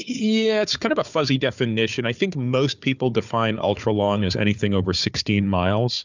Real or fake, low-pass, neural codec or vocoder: fake; 7.2 kHz; codec, 16 kHz, 4 kbps, FunCodec, trained on Chinese and English, 50 frames a second